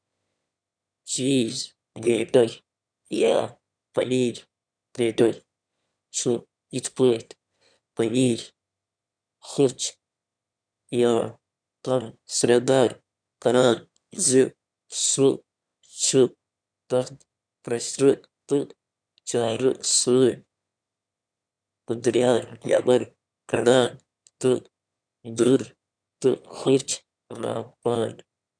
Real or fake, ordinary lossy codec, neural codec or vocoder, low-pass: fake; none; autoencoder, 22.05 kHz, a latent of 192 numbers a frame, VITS, trained on one speaker; 9.9 kHz